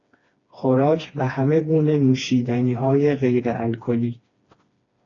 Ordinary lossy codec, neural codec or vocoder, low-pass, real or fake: AAC, 32 kbps; codec, 16 kHz, 2 kbps, FreqCodec, smaller model; 7.2 kHz; fake